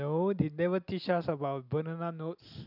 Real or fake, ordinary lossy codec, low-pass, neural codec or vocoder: real; none; 5.4 kHz; none